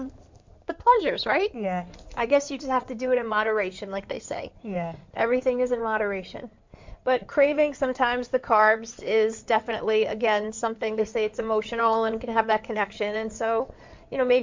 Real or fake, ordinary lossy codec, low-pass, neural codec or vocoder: fake; MP3, 64 kbps; 7.2 kHz; codec, 16 kHz in and 24 kHz out, 2.2 kbps, FireRedTTS-2 codec